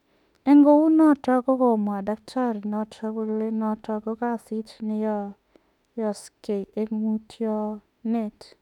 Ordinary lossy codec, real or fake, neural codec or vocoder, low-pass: none; fake; autoencoder, 48 kHz, 32 numbers a frame, DAC-VAE, trained on Japanese speech; 19.8 kHz